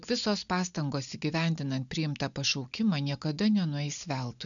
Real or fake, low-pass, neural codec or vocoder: real; 7.2 kHz; none